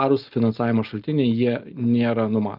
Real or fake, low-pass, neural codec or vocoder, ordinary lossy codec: real; 5.4 kHz; none; Opus, 32 kbps